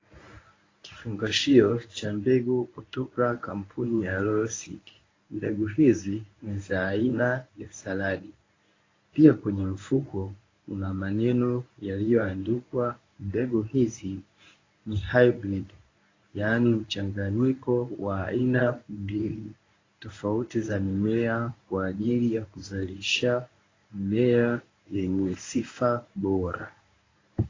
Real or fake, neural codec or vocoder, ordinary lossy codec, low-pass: fake; codec, 24 kHz, 0.9 kbps, WavTokenizer, medium speech release version 1; AAC, 32 kbps; 7.2 kHz